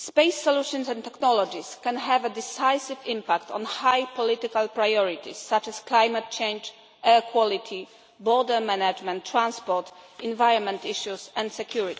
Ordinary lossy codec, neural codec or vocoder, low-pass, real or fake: none; none; none; real